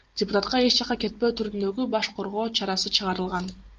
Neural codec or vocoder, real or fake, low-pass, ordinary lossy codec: none; real; 7.2 kHz; Opus, 24 kbps